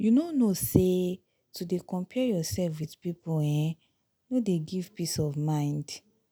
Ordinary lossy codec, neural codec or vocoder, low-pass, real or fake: none; none; none; real